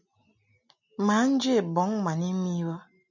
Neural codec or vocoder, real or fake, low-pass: none; real; 7.2 kHz